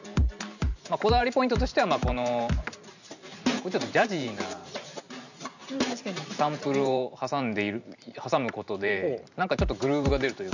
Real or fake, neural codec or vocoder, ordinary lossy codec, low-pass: fake; vocoder, 44.1 kHz, 128 mel bands every 512 samples, BigVGAN v2; none; 7.2 kHz